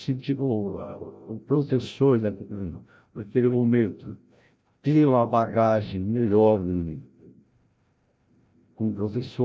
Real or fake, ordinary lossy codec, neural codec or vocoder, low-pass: fake; none; codec, 16 kHz, 0.5 kbps, FreqCodec, larger model; none